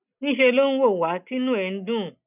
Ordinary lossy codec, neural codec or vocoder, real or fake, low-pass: none; none; real; 3.6 kHz